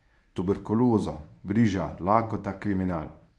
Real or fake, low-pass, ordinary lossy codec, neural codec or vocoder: fake; none; none; codec, 24 kHz, 0.9 kbps, WavTokenizer, medium speech release version 1